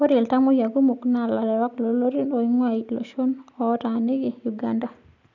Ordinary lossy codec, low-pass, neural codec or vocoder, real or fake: none; 7.2 kHz; none; real